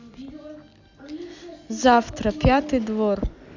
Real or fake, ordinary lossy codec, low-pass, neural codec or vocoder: real; none; 7.2 kHz; none